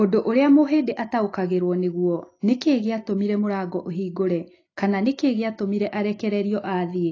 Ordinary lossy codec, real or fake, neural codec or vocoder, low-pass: AAC, 32 kbps; real; none; 7.2 kHz